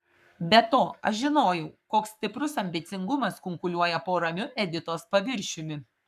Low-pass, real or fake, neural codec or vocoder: 14.4 kHz; fake; codec, 44.1 kHz, 7.8 kbps, Pupu-Codec